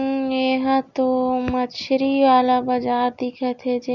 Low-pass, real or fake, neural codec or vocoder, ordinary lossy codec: 7.2 kHz; real; none; none